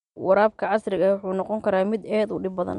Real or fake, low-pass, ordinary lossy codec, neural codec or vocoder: real; 10.8 kHz; MP3, 64 kbps; none